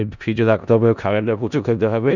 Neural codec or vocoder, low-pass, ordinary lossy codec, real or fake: codec, 16 kHz in and 24 kHz out, 0.4 kbps, LongCat-Audio-Codec, four codebook decoder; 7.2 kHz; none; fake